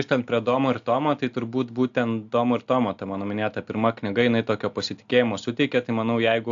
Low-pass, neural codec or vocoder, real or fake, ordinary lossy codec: 7.2 kHz; none; real; AAC, 64 kbps